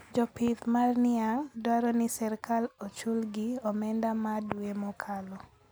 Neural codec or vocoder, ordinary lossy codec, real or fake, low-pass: none; none; real; none